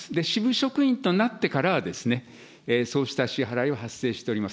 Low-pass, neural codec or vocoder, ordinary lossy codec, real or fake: none; none; none; real